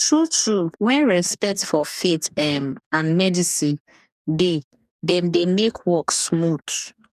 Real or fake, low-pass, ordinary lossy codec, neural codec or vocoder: fake; 14.4 kHz; none; codec, 44.1 kHz, 2.6 kbps, DAC